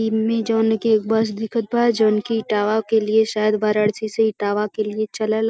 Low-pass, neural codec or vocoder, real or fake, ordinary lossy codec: none; none; real; none